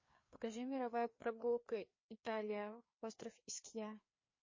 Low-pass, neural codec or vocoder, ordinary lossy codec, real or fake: 7.2 kHz; codec, 16 kHz, 2 kbps, FreqCodec, larger model; MP3, 32 kbps; fake